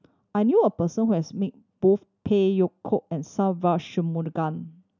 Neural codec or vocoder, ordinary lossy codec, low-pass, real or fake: none; none; 7.2 kHz; real